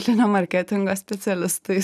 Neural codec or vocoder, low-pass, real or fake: none; 14.4 kHz; real